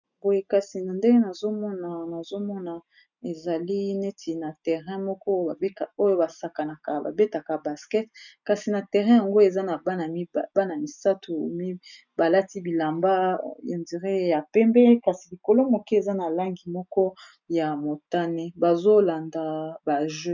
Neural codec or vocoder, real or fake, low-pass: none; real; 7.2 kHz